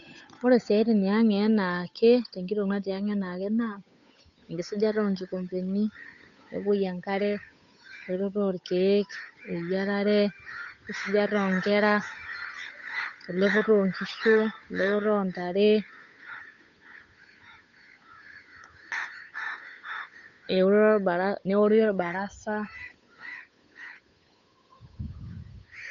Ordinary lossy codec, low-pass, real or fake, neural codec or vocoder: none; 7.2 kHz; fake; codec, 16 kHz, 8 kbps, FunCodec, trained on Chinese and English, 25 frames a second